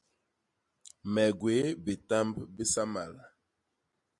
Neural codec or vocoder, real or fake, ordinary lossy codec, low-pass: none; real; MP3, 64 kbps; 10.8 kHz